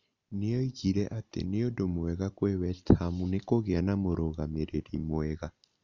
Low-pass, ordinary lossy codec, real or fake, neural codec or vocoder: 7.2 kHz; Opus, 64 kbps; real; none